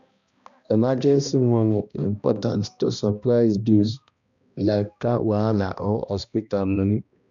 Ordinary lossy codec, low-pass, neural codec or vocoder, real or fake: none; 7.2 kHz; codec, 16 kHz, 1 kbps, X-Codec, HuBERT features, trained on balanced general audio; fake